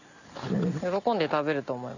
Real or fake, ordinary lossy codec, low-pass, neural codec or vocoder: real; none; 7.2 kHz; none